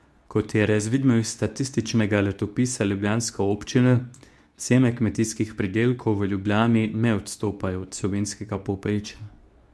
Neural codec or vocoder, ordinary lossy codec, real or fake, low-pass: codec, 24 kHz, 0.9 kbps, WavTokenizer, medium speech release version 2; none; fake; none